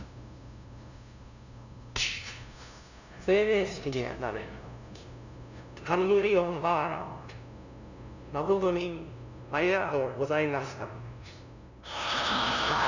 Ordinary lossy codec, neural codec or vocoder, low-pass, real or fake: none; codec, 16 kHz, 0.5 kbps, FunCodec, trained on LibriTTS, 25 frames a second; 7.2 kHz; fake